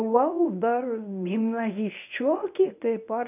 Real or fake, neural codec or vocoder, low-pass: fake; codec, 24 kHz, 0.9 kbps, WavTokenizer, medium speech release version 1; 3.6 kHz